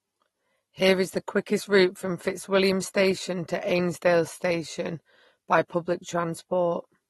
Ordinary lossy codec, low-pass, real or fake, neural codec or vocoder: AAC, 32 kbps; 19.8 kHz; real; none